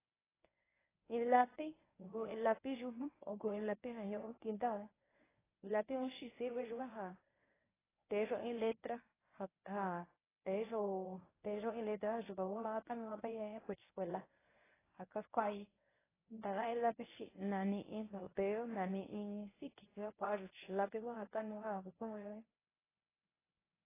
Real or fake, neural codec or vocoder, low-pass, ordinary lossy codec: fake; codec, 24 kHz, 0.9 kbps, WavTokenizer, medium speech release version 1; 3.6 kHz; AAC, 16 kbps